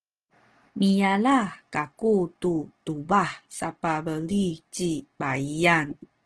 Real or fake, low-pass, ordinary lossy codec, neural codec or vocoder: real; 9.9 kHz; Opus, 16 kbps; none